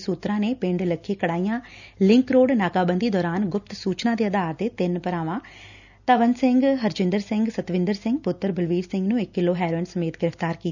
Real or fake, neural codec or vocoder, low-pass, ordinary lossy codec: real; none; 7.2 kHz; none